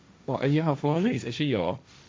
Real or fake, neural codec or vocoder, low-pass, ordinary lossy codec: fake; codec, 16 kHz, 1.1 kbps, Voila-Tokenizer; none; none